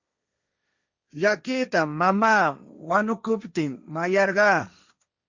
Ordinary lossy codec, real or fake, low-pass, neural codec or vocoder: Opus, 64 kbps; fake; 7.2 kHz; codec, 16 kHz, 1.1 kbps, Voila-Tokenizer